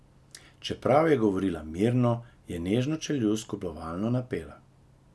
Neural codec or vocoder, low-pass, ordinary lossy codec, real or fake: none; none; none; real